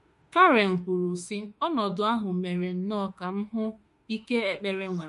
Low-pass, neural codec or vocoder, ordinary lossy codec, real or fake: 14.4 kHz; autoencoder, 48 kHz, 32 numbers a frame, DAC-VAE, trained on Japanese speech; MP3, 48 kbps; fake